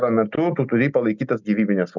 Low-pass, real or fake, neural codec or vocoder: 7.2 kHz; real; none